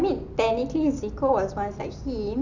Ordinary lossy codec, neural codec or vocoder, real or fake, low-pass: none; none; real; 7.2 kHz